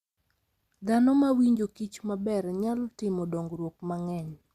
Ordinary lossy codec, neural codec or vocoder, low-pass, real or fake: Opus, 64 kbps; none; 14.4 kHz; real